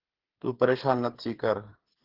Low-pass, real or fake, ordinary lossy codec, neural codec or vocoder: 5.4 kHz; fake; Opus, 16 kbps; codec, 16 kHz, 8 kbps, FreqCodec, smaller model